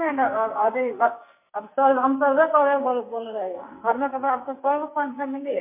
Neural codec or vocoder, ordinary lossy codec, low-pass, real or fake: codec, 44.1 kHz, 2.6 kbps, SNAC; MP3, 24 kbps; 3.6 kHz; fake